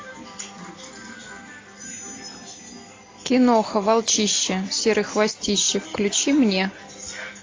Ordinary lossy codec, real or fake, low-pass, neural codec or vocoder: AAC, 32 kbps; real; 7.2 kHz; none